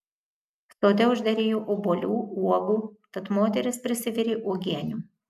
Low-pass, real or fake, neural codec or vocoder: 14.4 kHz; real; none